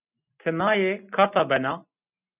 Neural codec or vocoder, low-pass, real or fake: none; 3.6 kHz; real